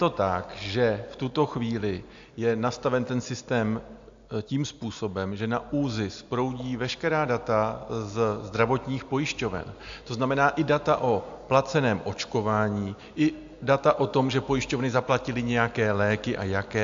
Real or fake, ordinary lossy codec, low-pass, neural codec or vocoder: real; AAC, 64 kbps; 7.2 kHz; none